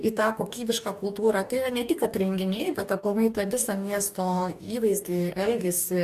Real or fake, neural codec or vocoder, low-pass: fake; codec, 44.1 kHz, 2.6 kbps, DAC; 14.4 kHz